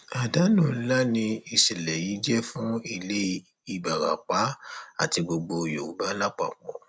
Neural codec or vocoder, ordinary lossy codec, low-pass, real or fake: none; none; none; real